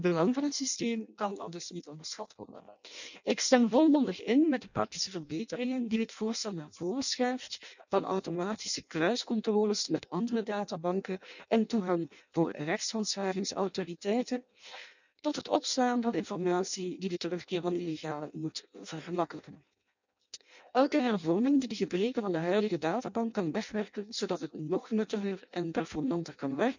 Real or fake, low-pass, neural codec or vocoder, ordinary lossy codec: fake; 7.2 kHz; codec, 16 kHz in and 24 kHz out, 0.6 kbps, FireRedTTS-2 codec; none